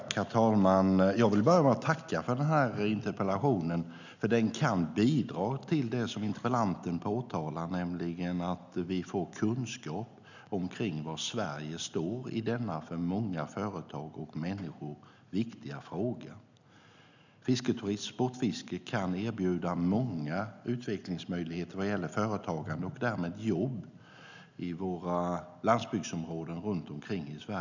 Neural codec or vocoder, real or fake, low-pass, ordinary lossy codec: none; real; 7.2 kHz; none